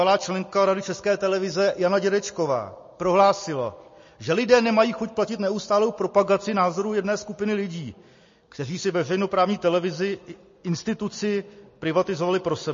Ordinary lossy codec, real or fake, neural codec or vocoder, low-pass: MP3, 32 kbps; real; none; 7.2 kHz